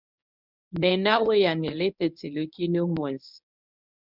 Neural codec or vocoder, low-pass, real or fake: codec, 24 kHz, 0.9 kbps, WavTokenizer, medium speech release version 1; 5.4 kHz; fake